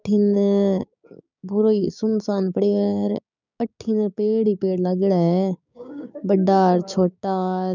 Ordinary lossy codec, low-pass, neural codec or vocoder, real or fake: none; 7.2 kHz; codec, 16 kHz, 6 kbps, DAC; fake